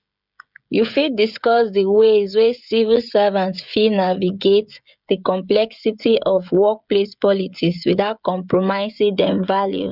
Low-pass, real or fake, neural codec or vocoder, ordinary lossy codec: 5.4 kHz; fake; codec, 16 kHz, 16 kbps, FreqCodec, smaller model; none